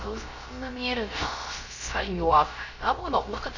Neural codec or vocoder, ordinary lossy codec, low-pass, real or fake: codec, 16 kHz, 0.3 kbps, FocalCodec; none; 7.2 kHz; fake